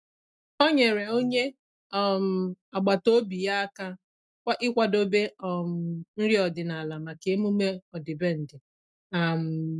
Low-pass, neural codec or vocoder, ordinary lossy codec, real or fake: none; none; none; real